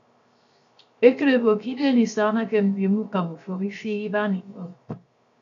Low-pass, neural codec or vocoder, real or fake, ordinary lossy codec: 7.2 kHz; codec, 16 kHz, 0.7 kbps, FocalCodec; fake; AAC, 64 kbps